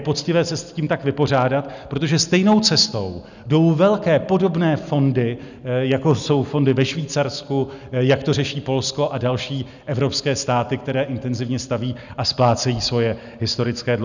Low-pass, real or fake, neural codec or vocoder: 7.2 kHz; real; none